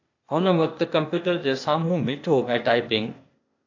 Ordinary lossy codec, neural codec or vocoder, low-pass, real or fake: AAC, 48 kbps; codec, 16 kHz, 0.8 kbps, ZipCodec; 7.2 kHz; fake